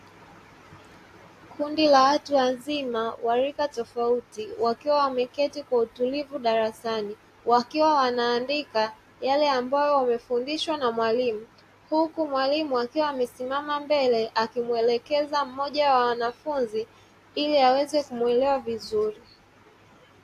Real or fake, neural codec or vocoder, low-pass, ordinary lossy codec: real; none; 14.4 kHz; AAC, 64 kbps